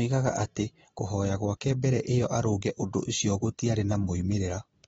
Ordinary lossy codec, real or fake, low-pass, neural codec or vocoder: AAC, 24 kbps; real; 10.8 kHz; none